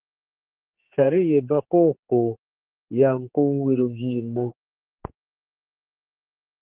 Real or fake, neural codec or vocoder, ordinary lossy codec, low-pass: fake; codec, 16 kHz, 4 kbps, X-Codec, HuBERT features, trained on general audio; Opus, 16 kbps; 3.6 kHz